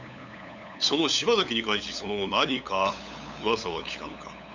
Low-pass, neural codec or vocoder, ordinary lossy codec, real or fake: 7.2 kHz; codec, 16 kHz, 8 kbps, FunCodec, trained on LibriTTS, 25 frames a second; none; fake